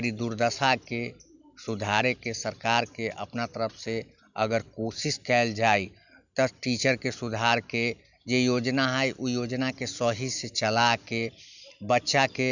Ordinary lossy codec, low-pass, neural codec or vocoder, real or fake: none; 7.2 kHz; none; real